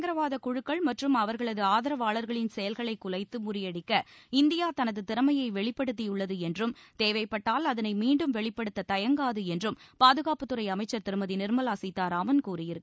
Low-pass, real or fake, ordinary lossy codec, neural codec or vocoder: none; real; none; none